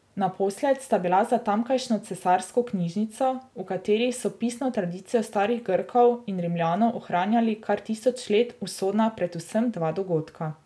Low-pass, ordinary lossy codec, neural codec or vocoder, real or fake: none; none; none; real